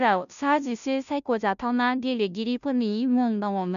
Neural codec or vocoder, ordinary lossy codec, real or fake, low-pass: codec, 16 kHz, 0.5 kbps, FunCodec, trained on Chinese and English, 25 frames a second; none; fake; 7.2 kHz